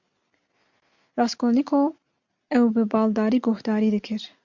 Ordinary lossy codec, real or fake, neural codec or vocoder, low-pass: MP3, 64 kbps; real; none; 7.2 kHz